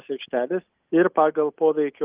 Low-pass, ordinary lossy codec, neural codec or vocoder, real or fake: 3.6 kHz; Opus, 32 kbps; none; real